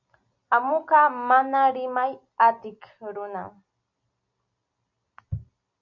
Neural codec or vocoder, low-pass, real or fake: none; 7.2 kHz; real